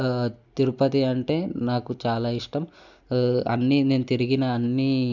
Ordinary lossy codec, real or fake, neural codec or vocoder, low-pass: none; real; none; 7.2 kHz